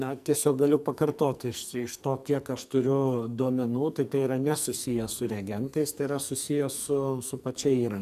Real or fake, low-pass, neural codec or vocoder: fake; 14.4 kHz; codec, 44.1 kHz, 2.6 kbps, SNAC